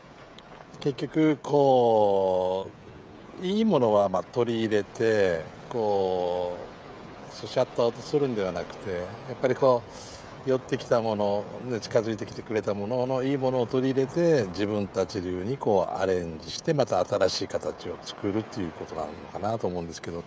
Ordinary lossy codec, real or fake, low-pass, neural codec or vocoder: none; fake; none; codec, 16 kHz, 16 kbps, FreqCodec, smaller model